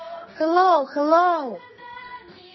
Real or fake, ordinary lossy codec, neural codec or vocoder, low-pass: fake; MP3, 24 kbps; codec, 44.1 kHz, 2.6 kbps, SNAC; 7.2 kHz